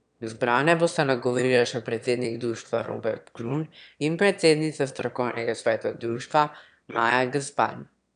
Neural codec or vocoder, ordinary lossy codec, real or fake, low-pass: autoencoder, 22.05 kHz, a latent of 192 numbers a frame, VITS, trained on one speaker; none; fake; 9.9 kHz